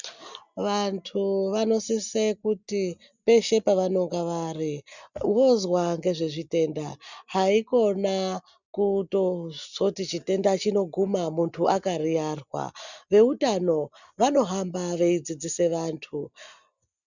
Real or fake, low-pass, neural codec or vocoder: real; 7.2 kHz; none